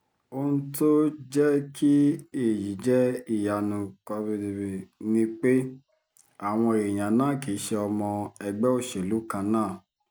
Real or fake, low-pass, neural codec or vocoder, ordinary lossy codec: real; none; none; none